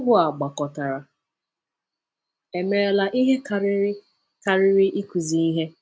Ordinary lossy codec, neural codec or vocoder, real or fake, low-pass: none; none; real; none